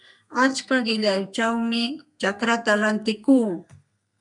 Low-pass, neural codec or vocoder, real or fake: 10.8 kHz; codec, 32 kHz, 1.9 kbps, SNAC; fake